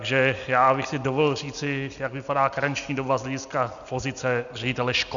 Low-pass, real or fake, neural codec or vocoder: 7.2 kHz; real; none